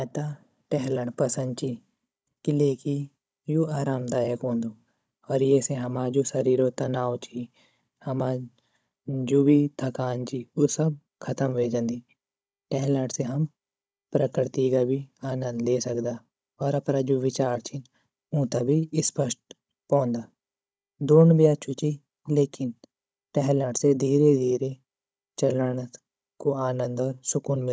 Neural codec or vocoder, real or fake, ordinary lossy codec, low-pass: codec, 16 kHz, 16 kbps, FunCodec, trained on Chinese and English, 50 frames a second; fake; none; none